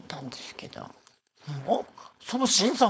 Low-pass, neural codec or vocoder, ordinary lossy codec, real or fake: none; codec, 16 kHz, 4.8 kbps, FACodec; none; fake